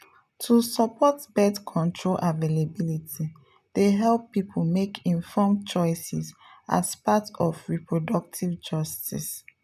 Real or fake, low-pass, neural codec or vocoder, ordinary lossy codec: real; 14.4 kHz; none; none